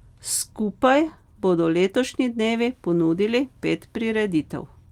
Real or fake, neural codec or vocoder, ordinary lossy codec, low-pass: real; none; Opus, 32 kbps; 19.8 kHz